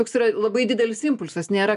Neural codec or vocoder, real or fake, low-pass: none; real; 10.8 kHz